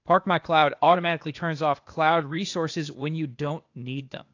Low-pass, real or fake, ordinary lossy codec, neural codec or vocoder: 7.2 kHz; fake; AAC, 48 kbps; codec, 16 kHz, 0.8 kbps, ZipCodec